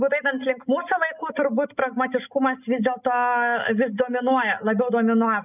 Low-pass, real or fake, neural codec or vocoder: 3.6 kHz; real; none